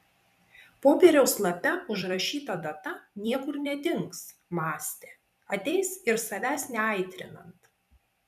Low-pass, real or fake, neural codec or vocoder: 14.4 kHz; fake; vocoder, 44.1 kHz, 128 mel bands every 256 samples, BigVGAN v2